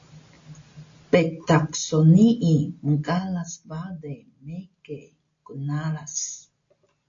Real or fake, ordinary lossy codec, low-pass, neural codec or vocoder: real; AAC, 48 kbps; 7.2 kHz; none